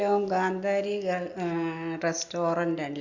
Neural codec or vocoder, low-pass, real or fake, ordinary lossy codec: none; 7.2 kHz; real; Opus, 64 kbps